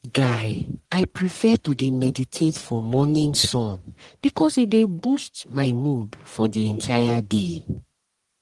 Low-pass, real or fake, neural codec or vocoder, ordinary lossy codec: 10.8 kHz; fake; codec, 44.1 kHz, 1.7 kbps, Pupu-Codec; Opus, 24 kbps